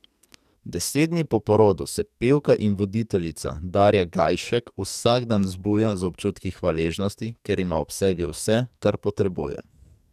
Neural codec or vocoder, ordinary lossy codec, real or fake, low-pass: codec, 44.1 kHz, 2.6 kbps, SNAC; none; fake; 14.4 kHz